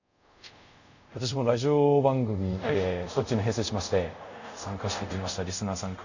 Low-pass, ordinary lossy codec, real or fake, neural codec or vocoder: 7.2 kHz; none; fake; codec, 24 kHz, 0.5 kbps, DualCodec